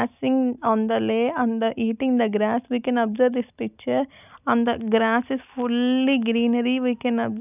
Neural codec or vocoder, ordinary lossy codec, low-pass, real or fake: none; none; 3.6 kHz; real